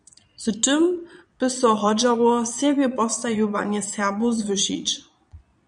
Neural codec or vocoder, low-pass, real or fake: vocoder, 22.05 kHz, 80 mel bands, Vocos; 9.9 kHz; fake